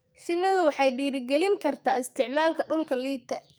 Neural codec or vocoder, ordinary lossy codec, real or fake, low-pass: codec, 44.1 kHz, 2.6 kbps, SNAC; none; fake; none